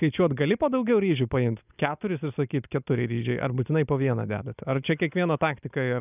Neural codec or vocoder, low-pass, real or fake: none; 3.6 kHz; real